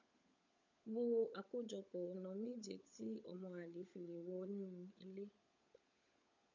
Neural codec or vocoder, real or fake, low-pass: codec, 16 kHz, 16 kbps, FunCodec, trained on LibriTTS, 50 frames a second; fake; 7.2 kHz